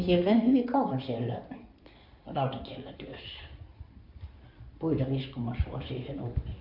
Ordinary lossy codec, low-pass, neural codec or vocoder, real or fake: none; 5.4 kHz; codec, 16 kHz in and 24 kHz out, 2.2 kbps, FireRedTTS-2 codec; fake